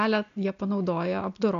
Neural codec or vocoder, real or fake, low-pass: none; real; 7.2 kHz